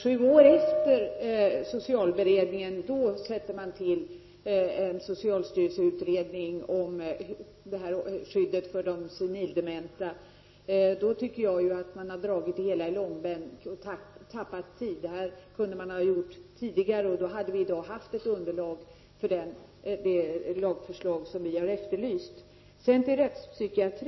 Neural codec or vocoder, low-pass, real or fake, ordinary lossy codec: none; 7.2 kHz; real; MP3, 24 kbps